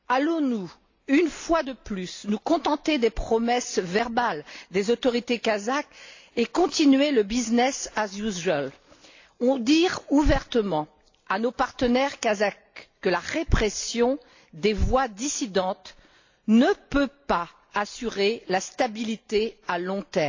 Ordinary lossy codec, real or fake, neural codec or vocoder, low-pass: AAC, 48 kbps; real; none; 7.2 kHz